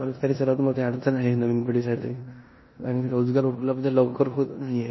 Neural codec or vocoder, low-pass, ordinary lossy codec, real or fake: codec, 16 kHz in and 24 kHz out, 0.9 kbps, LongCat-Audio-Codec, four codebook decoder; 7.2 kHz; MP3, 24 kbps; fake